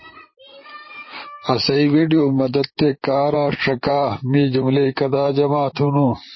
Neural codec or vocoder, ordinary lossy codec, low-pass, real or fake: vocoder, 44.1 kHz, 128 mel bands, Pupu-Vocoder; MP3, 24 kbps; 7.2 kHz; fake